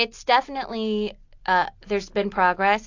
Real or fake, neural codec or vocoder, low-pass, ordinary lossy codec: real; none; 7.2 kHz; AAC, 48 kbps